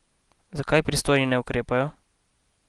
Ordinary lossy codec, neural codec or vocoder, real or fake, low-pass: Opus, 24 kbps; none; real; 10.8 kHz